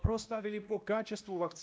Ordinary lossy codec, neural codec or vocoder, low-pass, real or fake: none; codec, 16 kHz, 1 kbps, X-Codec, WavLM features, trained on Multilingual LibriSpeech; none; fake